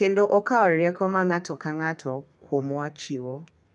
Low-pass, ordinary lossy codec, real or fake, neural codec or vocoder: 10.8 kHz; none; fake; codec, 32 kHz, 1.9 kbps, SNAC